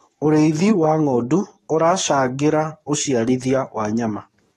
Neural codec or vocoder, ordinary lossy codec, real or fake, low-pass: codec, 44.1 kHz, 7.8 kbps, DAC; AAC, 32 kbps; fake; 19.8 kHz